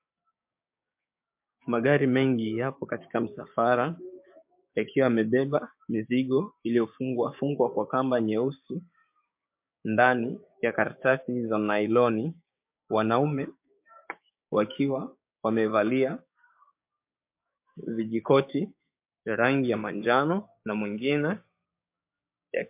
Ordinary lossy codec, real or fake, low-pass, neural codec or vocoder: MP3, 32 kbps; fake; 3.6 kHz; codec, 16 kHz, 6 kbps, DAC